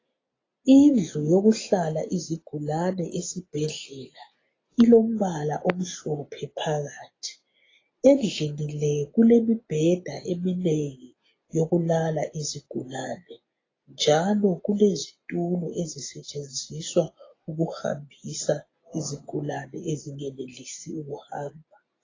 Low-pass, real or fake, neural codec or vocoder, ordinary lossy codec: 7.2 kHz; real; none; AAC, 32 kbps